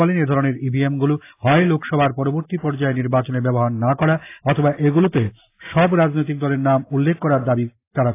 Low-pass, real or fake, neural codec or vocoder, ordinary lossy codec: 3.6 kHz; real; none; AAC, 24 kbps